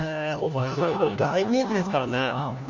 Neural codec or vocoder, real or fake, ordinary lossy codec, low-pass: codec, 16 kHz, 1 kbps, FunCodec, trained on LibriTTS, 50 frames a second; fake; none; 7.2 kHz